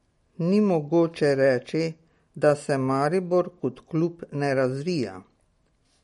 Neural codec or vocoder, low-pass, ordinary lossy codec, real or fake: none; 19.8 kHz; MP3, 48 kbps; real